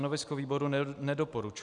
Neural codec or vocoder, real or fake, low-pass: none; real; 10.8 kHz